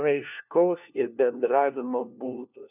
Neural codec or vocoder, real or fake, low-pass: codec, 16 kHz, 1 kbps, FunCodec, trained on LibriTTS, 50 frames a second; fake; 3.6 kHz